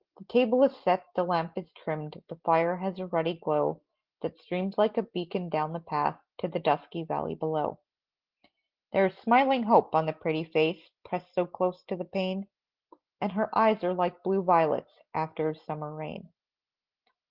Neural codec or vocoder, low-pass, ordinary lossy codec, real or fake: none; 5.4 kHz; Opus, 16 kbps; real